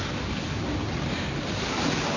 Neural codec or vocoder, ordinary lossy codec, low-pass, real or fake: none; none; 7.2 kHz; real